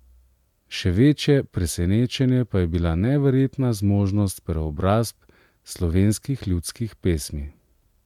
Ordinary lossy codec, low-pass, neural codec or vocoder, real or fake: MP3, 96 kbps; 19.8 kHz; none; real